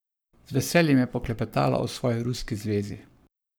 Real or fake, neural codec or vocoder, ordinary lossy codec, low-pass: fake; codec, 44.1 kHz, 7.8 kbps, Pupu-Codec; none; none